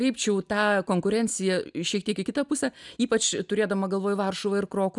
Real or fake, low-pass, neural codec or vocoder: real; 10.8 kHz; none